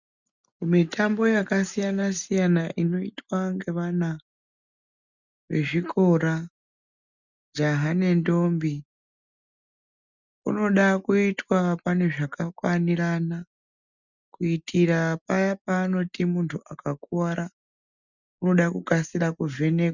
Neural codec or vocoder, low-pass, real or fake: none; 7.2 kHz; real